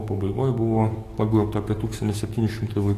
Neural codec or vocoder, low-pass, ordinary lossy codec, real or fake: codec, 44.1 kHz, 7.8 kbps, DAC; 14.4 kHz; AAC, 48 kbps; fake